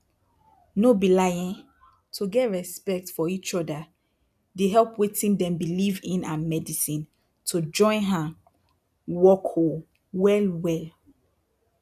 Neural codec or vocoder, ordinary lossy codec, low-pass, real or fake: none; none; 14.4 kHz; real